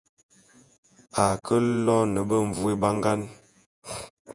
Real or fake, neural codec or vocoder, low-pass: fake; vocoder, 48 kHz, 128 mel bands, Vocos; 10.8 kHz